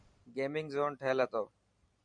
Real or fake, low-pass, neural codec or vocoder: fake; 9.9 kHz; vocoder, 44.1 kHz, 128 mel bands every 512 samples, BigVGAN v2